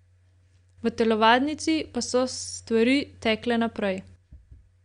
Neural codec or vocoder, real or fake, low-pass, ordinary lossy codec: none; real; 9.9 kHz; Opus, 64 kbps